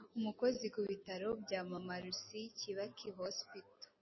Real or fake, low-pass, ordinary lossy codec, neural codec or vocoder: real; 7.2 kHz; MP3, 24 kbps; none